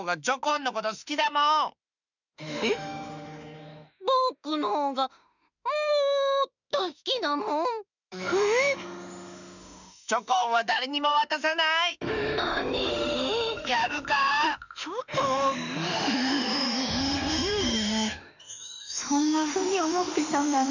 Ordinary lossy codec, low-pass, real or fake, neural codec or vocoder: none; 7.2 kHz; fake; autoencoder, 48 kHz, 32 numbers a frame, DAC-VAE, trained on Japanese speech